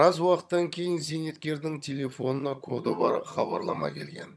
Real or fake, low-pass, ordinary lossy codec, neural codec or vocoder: fake; none; none; vocoder, 22.05 kHz, 80 mel bands, HiFi-GAN